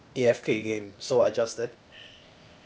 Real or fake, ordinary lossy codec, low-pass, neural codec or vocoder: fake; none; none; codec, 16 kHz, 0.8 kbps, ZipCodec